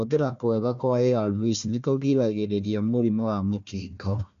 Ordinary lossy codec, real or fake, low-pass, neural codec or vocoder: none; fake; 7.2 kHz; codec, 16 kHz, 1 kbps, FunCodec, trained on Chinese and English, 50 frames a second